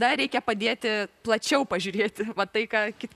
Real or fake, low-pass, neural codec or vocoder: fake; 14.4 kHz; vocoder, 44.1 kHz, 128 mel bands, Pupu-Vocoder